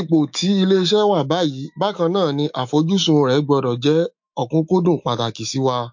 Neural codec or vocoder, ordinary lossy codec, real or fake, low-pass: autoencoder, 48 kHz, 128 numbers a frame, DAC-VAE, trained on Japanese speech; MP3, 48 kbps; fake; 7.2 kHz